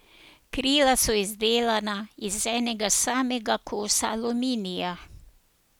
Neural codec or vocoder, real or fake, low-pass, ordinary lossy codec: none; real; none; none